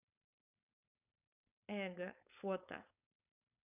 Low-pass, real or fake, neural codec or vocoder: 3.6 kHz; fake; codec, 16 kHz, 4.8 kbps, FACodec